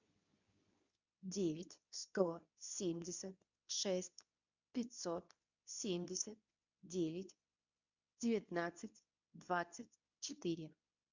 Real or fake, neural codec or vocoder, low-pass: fake; codec, 24 kHz, 0.9 kbps, WavTokenizer, medium speech release version 2; 7.2 kHz